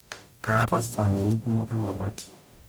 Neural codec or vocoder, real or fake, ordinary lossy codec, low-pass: codec, 44.1 kHz, 0.9 kbps, DAC; fake; none; none